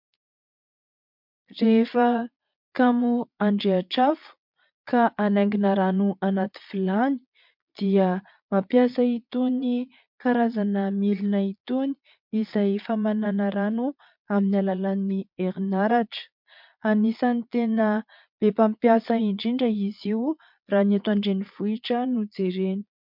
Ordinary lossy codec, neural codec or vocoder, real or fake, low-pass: MP3, 48 kbps; vocoder, 22.05 kHz, 80 mel bands, Vocos; fake; 5.4 kHz